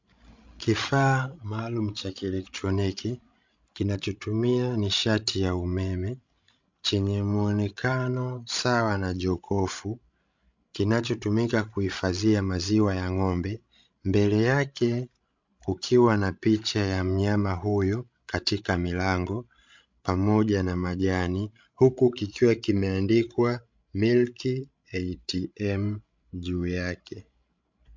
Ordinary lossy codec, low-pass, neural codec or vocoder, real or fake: MP3, 64 kbps; 7.2 kHz; codec, 16 kHz, 16 kbps, FreqCodec, larger model; fake